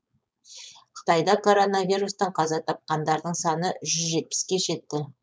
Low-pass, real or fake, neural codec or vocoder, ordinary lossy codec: none; fake; codec, 16 kHz, 4.8 kbps, FACodec; none